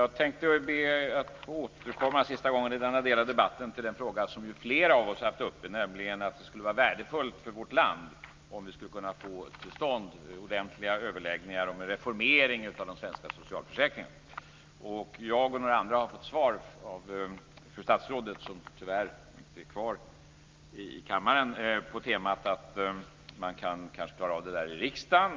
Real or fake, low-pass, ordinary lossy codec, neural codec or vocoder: real; 7.2 kHz; Opus, 24 kbps; none